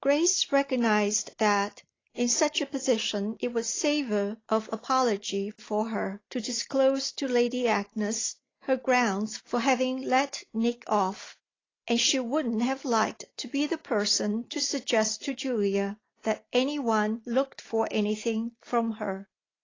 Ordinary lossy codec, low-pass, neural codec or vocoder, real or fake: AAC, 32 kbps; 7.2 kHz; none; real